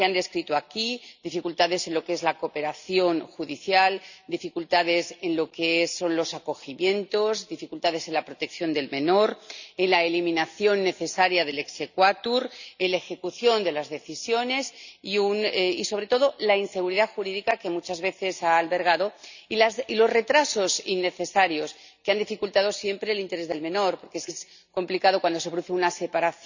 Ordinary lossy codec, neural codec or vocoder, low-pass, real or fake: none; none; 7.2 kHz; real